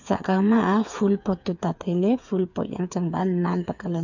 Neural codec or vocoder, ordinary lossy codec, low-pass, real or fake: codec, 16 kHz, 8 kbps, FreqCodec, smaller model; none; 7.2 kHz; fake